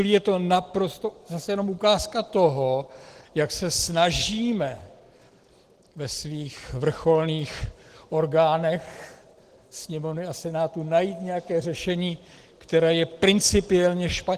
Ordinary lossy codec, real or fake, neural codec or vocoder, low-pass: Opus, 16 kbps; real; none; 14.4 kHz